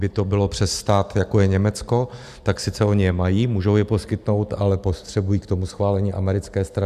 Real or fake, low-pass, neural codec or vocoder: real; 14.4 kHz; none